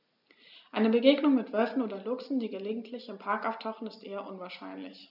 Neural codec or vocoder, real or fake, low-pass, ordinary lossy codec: none; real; 5.4 kHz; none